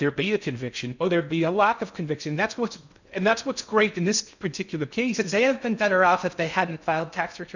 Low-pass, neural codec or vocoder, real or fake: 7.2 kHz; codec, 16 kHz in and 24 kHz out, 0.6 kbps, FocalCodec, streaming, 2048 codes; fake